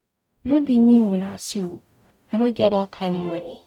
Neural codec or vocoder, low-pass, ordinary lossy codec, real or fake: codec, 44.1 kHz, 0.9 kbps, DAC; 19.8 kHz; none; fake